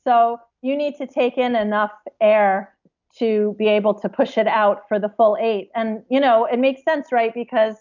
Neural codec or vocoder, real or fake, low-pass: none; real; 7.2 kHz